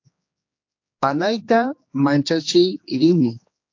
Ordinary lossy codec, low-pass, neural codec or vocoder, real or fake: AAC, 48 kbps; 7.2 kHz; codec, 16 kHz, 2 kbps, X-Codec, HuBERT features, trained on general audio; fake